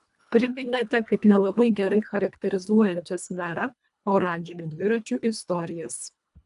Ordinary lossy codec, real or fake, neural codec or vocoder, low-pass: AAC, 96 kbps; fake; codec, 24 kHz, 1.5 kbps, HILCodec; 10.8 kHz